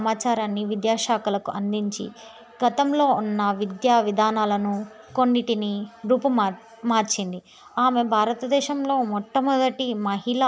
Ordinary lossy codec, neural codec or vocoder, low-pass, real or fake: none; none; none; real